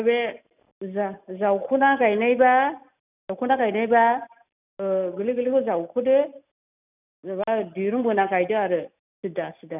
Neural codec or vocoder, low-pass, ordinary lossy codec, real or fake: none; 3.6 kHz; none; real